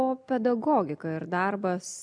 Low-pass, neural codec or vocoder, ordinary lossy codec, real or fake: 9.9 kHz; none; AAC, 64 kbps; real